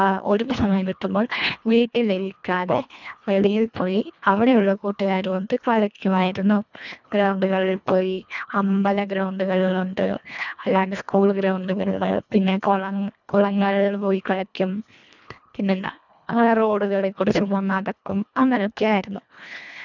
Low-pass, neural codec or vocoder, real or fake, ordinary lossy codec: 7.2 kHz; codec, 24 kHz, 1.5 kbps, HILCodec; fake; none